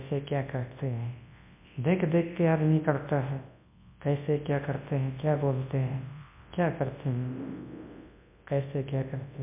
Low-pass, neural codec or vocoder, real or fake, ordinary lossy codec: 3.6 kHz; codec, 24 kHz, 0.9 kbps, WavTokenizer, large speech release; fake; MP3, 32 kbps